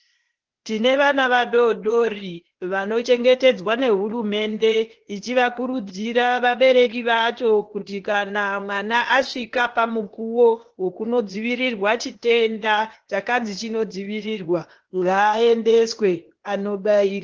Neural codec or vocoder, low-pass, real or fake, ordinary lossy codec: codec, 16 kHz, 0.8 kbps, ZipCodec; 7.2 kHz; fake; Opus, 16 kbps